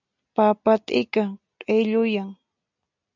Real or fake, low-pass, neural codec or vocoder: real; 7.2 kHz; none